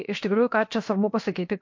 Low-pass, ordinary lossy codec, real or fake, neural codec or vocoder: 7.2 kHz; MP3, 48 kbps; fake; codec, 16 kHz, 0.7 kbps, FocalCodec